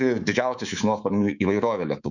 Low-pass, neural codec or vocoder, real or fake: 7.2 kHz; codec, 24 kHz, 3.1 kbps, DualCodec; fake